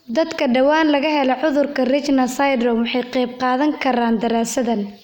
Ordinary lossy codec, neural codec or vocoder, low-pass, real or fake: none; none; 19.8 kHz; real